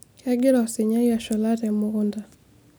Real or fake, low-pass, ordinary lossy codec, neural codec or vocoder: real; none; none; none